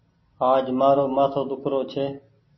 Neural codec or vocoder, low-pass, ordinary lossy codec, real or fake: none; 7.2 kHz; MP3, 24 kbps; real